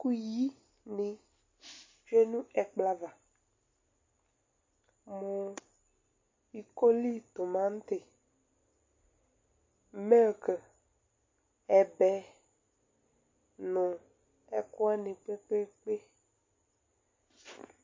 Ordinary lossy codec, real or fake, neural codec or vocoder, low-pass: MP3, 32 kbps; real; none; 7.2 kHz